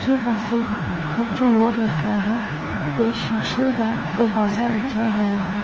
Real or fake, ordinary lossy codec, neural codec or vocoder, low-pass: fake; Opus, 24 kbps; codec, 16 kHz, 1 kbps, FunCodec, trained on LibriTTS, 50 frames a second; 7.2 kHz